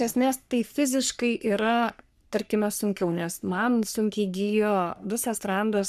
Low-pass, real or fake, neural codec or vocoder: 14.4 kHz; fake; codec, 44.1 kHz, 3.4 kbps, Pupu-Codec